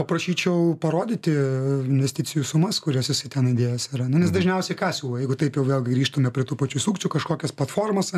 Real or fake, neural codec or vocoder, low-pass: real; none; 14.4 kHz